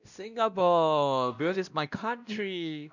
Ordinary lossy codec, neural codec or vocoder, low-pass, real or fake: none; codec, 16 kHz, 1 kbps, X-Codec, WavLM features, trained on Multilingual LibriSpeech; 7.2 kHz; fake